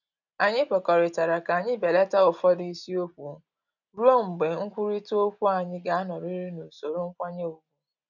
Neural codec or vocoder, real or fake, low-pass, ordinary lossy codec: none; real; 7.2 kHz; none